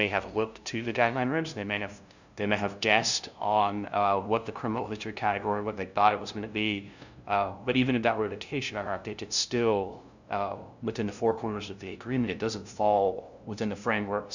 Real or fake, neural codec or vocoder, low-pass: fake; codec, 16 kHz, 0.5 kbps, FunCodec, trained on LibriTTS, 25 frames a second; 7.2 kHz